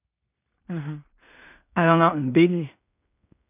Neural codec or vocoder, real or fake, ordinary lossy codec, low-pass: codec, 16 kHz in and 24 kHz out, 0.4 kbps, LongCat-Audio-Codec, two codebook decoder; fake; none; 3.6 kHz